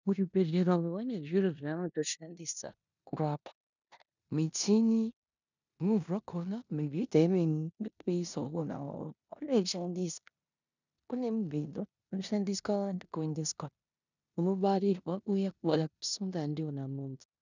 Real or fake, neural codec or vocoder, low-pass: fake; codec, 16 kHz in and 24 kHz out, 0.9 kbps, LongCat-Audio-Codec, four codebook decoder; 7.2 kHz